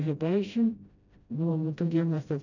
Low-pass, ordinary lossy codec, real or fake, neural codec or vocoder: 7.2 kHz; none; fake; codec, 16 kHz, 0.5 kbps, FreqCodec, smaller model